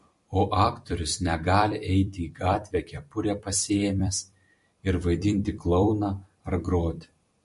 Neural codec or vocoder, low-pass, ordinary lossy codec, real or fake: vocoder, 44.1 kHz, 128 mel bands every 256 samples, BigVGAN v2; 14.4 kHz; MP3, 48 kbps; fake